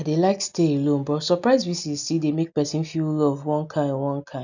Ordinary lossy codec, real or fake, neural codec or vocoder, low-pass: none; real; none; 7.2 kHz